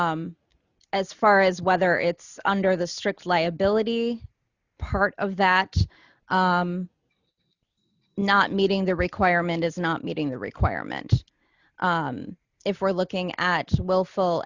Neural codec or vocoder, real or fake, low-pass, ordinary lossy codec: none; real; 7.2 kHz; Opus, 64 kbps